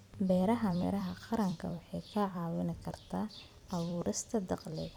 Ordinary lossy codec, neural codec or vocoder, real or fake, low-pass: none; vocoder, 44.1 kHz, 128 mel bands every 256 samples, BigVGAN v2; fake; 19.8 kHz